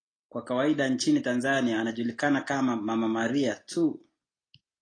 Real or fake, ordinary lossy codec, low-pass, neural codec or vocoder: real; AAC, 32 kbps; 9.9 kHz; none